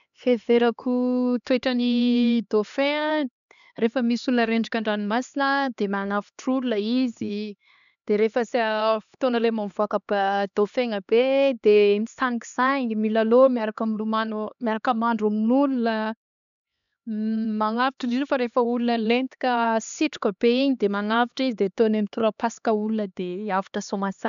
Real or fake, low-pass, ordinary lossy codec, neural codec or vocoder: fake; 7.2 kHz; none; codec, 16 kHz, 2 kbps, X-Codec, HuBERT features, trained on LibriSpeech